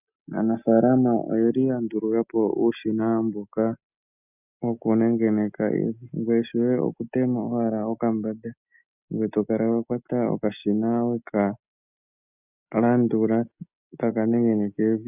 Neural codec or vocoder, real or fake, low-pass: none; real; 3.6 kHz